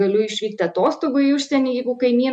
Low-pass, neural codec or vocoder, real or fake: 10.8 kHz; none; real